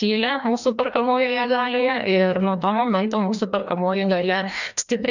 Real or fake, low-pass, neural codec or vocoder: fake; 7.2 kHz; codec, 16 kHz, 1 kbps, FreqCodec, larger model